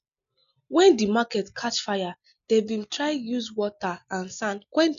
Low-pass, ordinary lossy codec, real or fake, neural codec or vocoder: 7.2 kHz; none; real; none